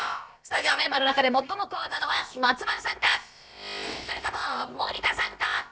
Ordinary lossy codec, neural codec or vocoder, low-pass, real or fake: none; codec, 16 kHz, about 1 kbps, DyCAST, with the encoder's durations; none; fake